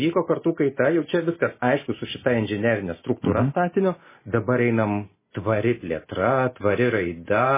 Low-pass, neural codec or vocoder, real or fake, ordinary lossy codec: 3.6 kHz; none; real; MP3, 16 kbps